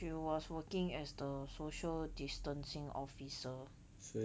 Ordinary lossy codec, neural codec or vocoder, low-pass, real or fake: none; none; none; real